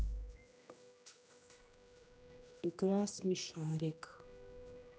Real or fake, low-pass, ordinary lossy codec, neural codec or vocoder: fake; none; none; codec, 16 kHz, 1 kbps, X-Codec, HuBERT features, trained on general audio